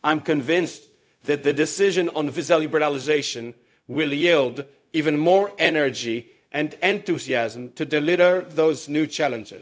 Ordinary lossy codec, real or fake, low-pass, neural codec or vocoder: none; fake; none; codec, 16 kHz, 0.4 kbps, LongCat-Audio-Codec